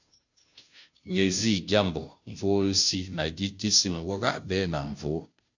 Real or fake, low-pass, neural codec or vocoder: fake; 7.2 kHz; codec, 16 kHz, 0.5 kbps, FunCodec, trained on Chinese and English, 25 frames a second